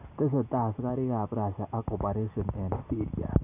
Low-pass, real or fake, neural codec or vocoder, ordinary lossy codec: 3.6 kHz; real; none; none